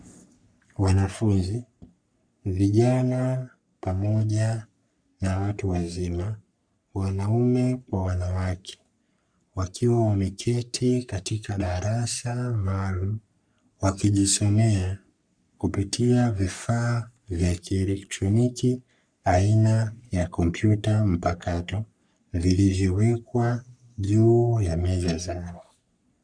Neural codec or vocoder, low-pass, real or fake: codec, 44.1 kHz, 3.4 kbps, Pupu-Codec; 9.9 kHz; fake